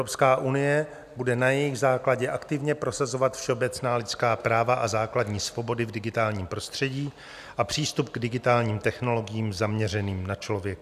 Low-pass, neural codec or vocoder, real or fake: 14.4 kHz; none; real